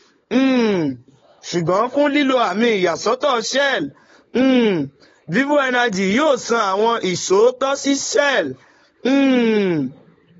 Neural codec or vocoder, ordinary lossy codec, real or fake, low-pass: codec, 16 kHz, 16 kbps, FunCodec, trained on LibriTTS, 50 frames a second; AAC, 24 kbps; fake; 7.2 kHz